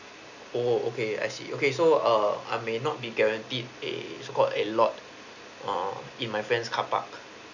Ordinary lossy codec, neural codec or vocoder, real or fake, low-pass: none; none; real; 7.2 kHz